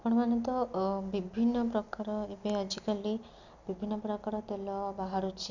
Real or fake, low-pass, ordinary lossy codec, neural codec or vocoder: real; 7.2 kHz; none; none